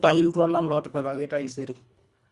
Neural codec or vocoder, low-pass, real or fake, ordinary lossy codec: codec, 24 kHz, 1.5 kbps, HILCodec; 10.8 kHz; fake; Opus, 64 kbps